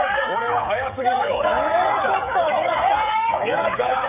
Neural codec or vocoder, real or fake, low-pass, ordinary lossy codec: codec, 16 kHz, 16 kbps, FreqCodec, smaller model; fake; 3.6 kHz; none